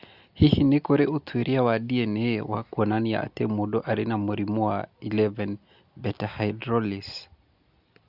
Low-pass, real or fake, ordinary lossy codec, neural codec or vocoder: 5.4 kHz; real; Opus, 64 kbps; none